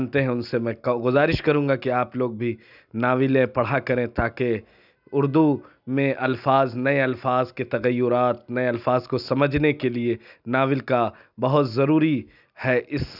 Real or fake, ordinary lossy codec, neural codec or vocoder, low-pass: real; none; none; 5.4 kHz